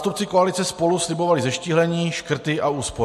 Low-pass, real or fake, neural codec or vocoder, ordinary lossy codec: 14.4 kHz; real; none; MP3, 64 kbps